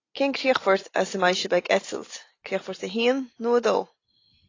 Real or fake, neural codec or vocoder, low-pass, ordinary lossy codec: real; none; 7.2 kHz; AAC, 32 kbps